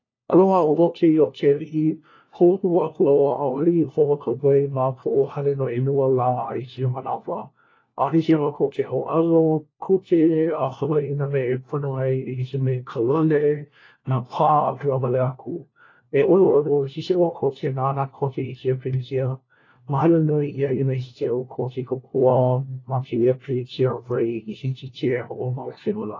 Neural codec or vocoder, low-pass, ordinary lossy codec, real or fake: codec, 16 kHz, 1 kbps, FunCodec, trained on LibriTTS, 50 frames a second; 7.2 kHz; AAC, 32 kbps; fake